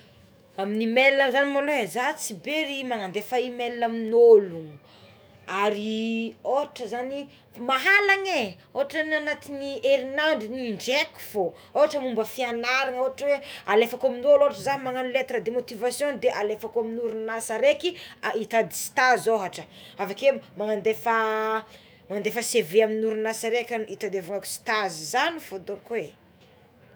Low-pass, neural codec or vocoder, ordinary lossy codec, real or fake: none; autoencoder, 48 kHz, 128 numbers a frame, DAC-VAE, trained on Japanese speech; none; fake